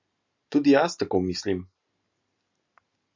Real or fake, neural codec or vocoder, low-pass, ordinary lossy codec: real; none; 7.2 kHz; MP3, 64 kbps